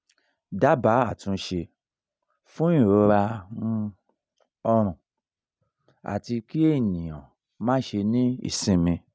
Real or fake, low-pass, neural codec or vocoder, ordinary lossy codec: real; none; none; none